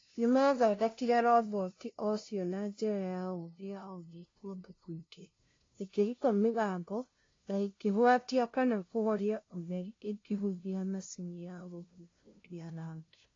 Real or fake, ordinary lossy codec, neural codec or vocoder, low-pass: fake; AAC, 32 kbps; codec, 16 kHz, 0.5 kbps, FunCodec, trained on LibriTTS, 25 frames a second; 7.2 kHz